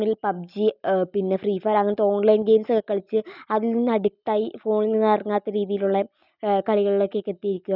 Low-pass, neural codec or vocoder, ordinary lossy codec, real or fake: 5.4 kHz; none; none; real